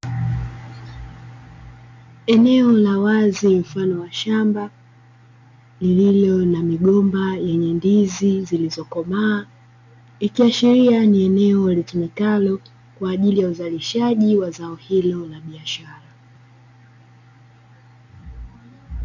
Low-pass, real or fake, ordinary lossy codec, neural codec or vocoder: 7.2 kHz; real; MP3, 64 kbps; none